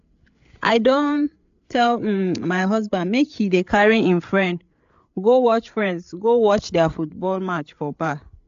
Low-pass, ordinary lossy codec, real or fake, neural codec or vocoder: 7.2 kHz; AAC, 64 kbps; fake; codec, 16 kHz, 8 kbps, FreqCodec, larger model